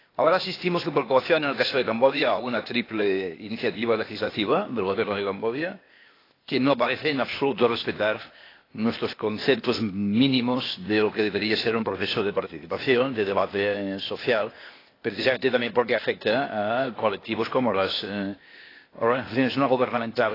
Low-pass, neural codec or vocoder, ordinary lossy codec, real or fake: 5.4 kHz; codec, 16 kHz, 0.8 kbps, ZipCodec; AAC, 24 kbps; fake